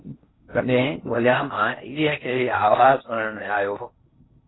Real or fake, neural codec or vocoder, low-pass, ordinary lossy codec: fake; codec, 16 kHz in and 24 kHz out, 0.6 kbps, FocalCodec, streaming, 4096 codes; 7.2 kHz; AAC, 16 kbps